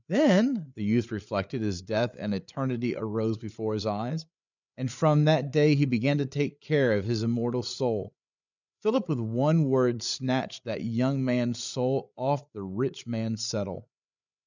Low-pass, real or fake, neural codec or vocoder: 7.2 kHz; fake; codec, 16 kHz, 8 kbps, FreqCodec, larger model